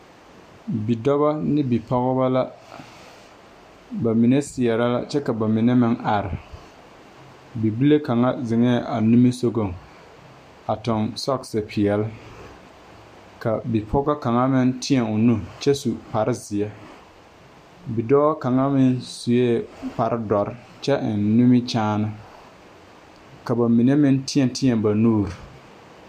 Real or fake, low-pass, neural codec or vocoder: real; 14.4 kHz; none